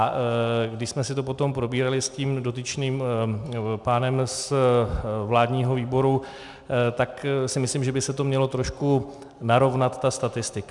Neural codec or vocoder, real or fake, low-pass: none; real; 10.8 kHz